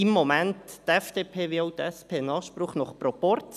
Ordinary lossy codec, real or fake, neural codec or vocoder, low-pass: none; real; none; 14.4 kHz